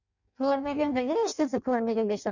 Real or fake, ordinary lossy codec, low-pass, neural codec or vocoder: fake; none; 7.2 kHz; codec, 16 kHz in and 24 kHz out, 0.6 kbps, FireRedTTS-2 codec